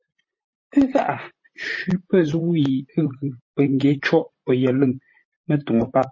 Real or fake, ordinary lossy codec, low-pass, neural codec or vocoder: fake; MP3, 32 kbps; 7.2 kHz; vocoder, 44.1 kHz, 128 mel bands, Pupu-Vocoder